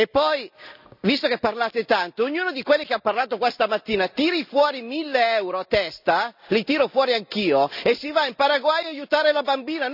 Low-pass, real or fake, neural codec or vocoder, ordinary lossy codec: 5.4 kHz; real; none; none